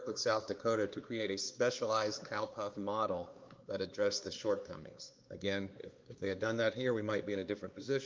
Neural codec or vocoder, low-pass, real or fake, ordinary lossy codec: codec, 16 kHz, 2 kbps, X-Codec, WavLM features, trained on Multilingual LibriSpeech; 7.2 kHz; fake; Opus, 24 kbps